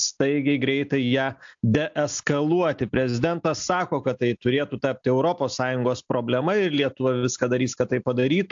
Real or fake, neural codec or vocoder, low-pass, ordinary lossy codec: real; none; 7.2 kHz; AAC, 64 kbps